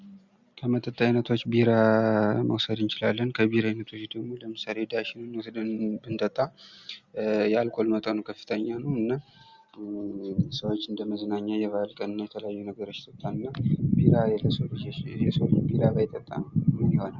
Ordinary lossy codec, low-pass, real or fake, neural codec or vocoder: Opus, 64 kbps; 7.2 kHz; real; none